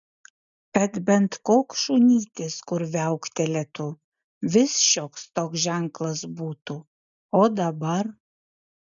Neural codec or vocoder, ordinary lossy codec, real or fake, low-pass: none; MP3, 96 kbps; real; 7.2 kHz